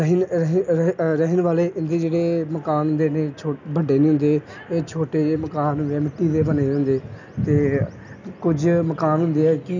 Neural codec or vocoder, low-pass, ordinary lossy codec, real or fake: none; 7.2 kHz; none; real